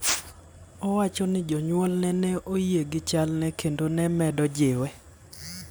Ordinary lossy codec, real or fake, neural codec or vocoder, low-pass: none; real; none; none